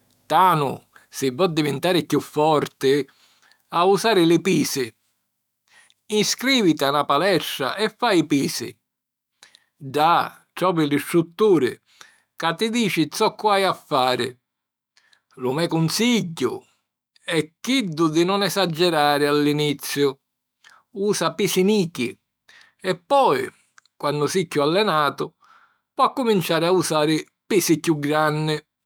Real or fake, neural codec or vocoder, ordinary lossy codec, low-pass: fake; autoencoder, 48 kHz, 128 numbers a frame, DAC-VAE, trained on Japanese speech; none; none